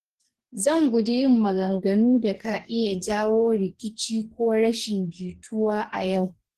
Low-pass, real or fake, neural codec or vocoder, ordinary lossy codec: 14.4 kHz; fake; codec, 44.1 kHz, 2.6 kbps, DAC; Opus, 16 kbps